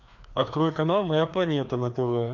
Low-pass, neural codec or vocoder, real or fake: 7.2 kHz; codec, 16 kHz, 2 kbps, FreqCodec, larger model; fake